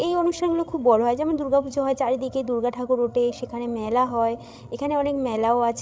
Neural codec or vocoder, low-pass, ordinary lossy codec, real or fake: codec, 16 kHz, 16 kbps, FreqCodec, larger model; none; none; fake